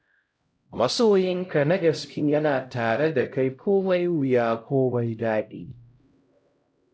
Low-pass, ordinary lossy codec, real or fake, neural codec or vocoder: none; none; fake; codec, 16 kHz, 0.5 kbps, X-Codec, HuBERT features, trained on LibriSpeech